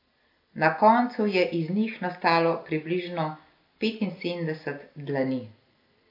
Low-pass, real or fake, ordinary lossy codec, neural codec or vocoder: 5.4 kHz; real; AAC, 32 kbps; none